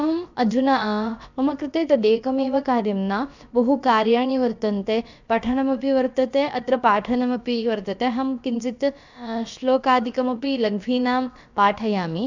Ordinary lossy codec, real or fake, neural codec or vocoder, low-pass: none; fake; codec, 16 kHz, about 1 kbps, DyCAST, with the encoder's durations; 7.2 kHz